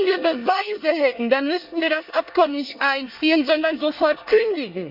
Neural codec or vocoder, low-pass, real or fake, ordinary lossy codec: codec, 24 kHz, 1 kbps, SNAC; 5.4 kHz; fake; Opus, 64 kbps